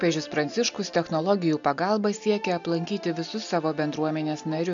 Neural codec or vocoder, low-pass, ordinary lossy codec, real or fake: none; 7.2 kHz; MP3, 64 kbps; real